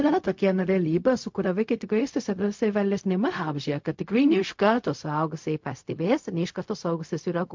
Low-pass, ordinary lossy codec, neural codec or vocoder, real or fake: 7.2 kHz; MP3, 48 kbps; codec, 16 kHz, 0.4 kbps, LongCat-Audio-Codec; fake